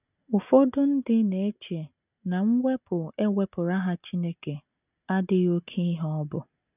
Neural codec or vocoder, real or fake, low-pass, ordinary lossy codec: none; real; 3.6 kHz; none